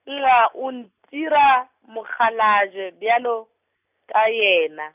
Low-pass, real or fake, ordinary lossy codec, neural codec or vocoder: 3.6 kHz; real; none; none